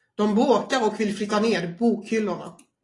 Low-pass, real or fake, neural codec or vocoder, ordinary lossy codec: 10.8 kHz; real; none; AAC, 32 kbps